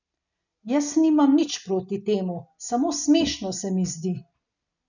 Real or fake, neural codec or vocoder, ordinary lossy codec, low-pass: real; none; none; 7.2 kHz